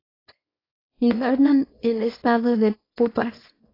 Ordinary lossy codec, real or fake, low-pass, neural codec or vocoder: AAC, 24 kbps; fake; 5.4 kHz; codec, 24 kHz, 0.9 kbps, WavTokenizer, small release